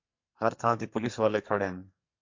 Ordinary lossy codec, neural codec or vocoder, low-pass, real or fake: MP3, 48 kbps; codec, 44.1 kHz, 2.6 kbps, SNAC; 7.2 kHz; fake